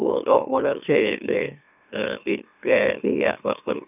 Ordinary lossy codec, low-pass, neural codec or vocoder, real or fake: none; 3.6 kHz; autoencoder, 44.1 kHz, a latent of 192 numbers a frame, MeloTTS; fake